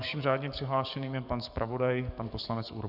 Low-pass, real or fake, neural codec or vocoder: 5.4 kHz; fake; codec, 44.1 kHz, 7.8 kbps, DAC